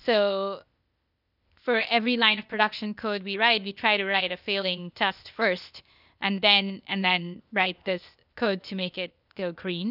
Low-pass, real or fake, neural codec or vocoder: 5.4 kHz; fake; codec, 16 kHz, 0.8 kbps, ZipCodec